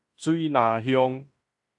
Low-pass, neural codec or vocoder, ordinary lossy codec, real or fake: 10.8 kHz; codec, 16 kHz in and 24 kHz out, 0.9 kbps, LongCat-Audio-Codec, fine tuned four codebook decoder; AAC, 64 kbps; fake